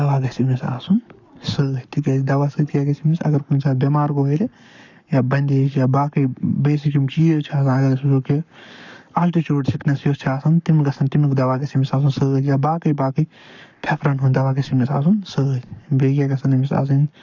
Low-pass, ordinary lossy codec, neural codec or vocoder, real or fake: 7.2 kHz; none; codec, 44.1 kHz, 7.8 kbps, Pupu-Codec; fake